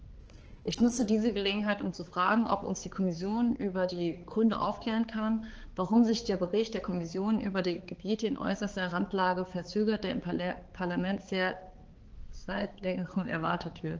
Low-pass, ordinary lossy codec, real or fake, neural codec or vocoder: 7.2 kHz; Opus, 16 kbps; fake; codec, 16 kHz, 4 kbps, X-Codec, HuBERT features, trained on balanced general audio